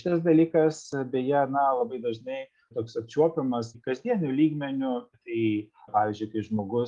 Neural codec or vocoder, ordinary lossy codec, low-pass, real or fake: none; Opus, 32 kbps; 10.8 kHz; real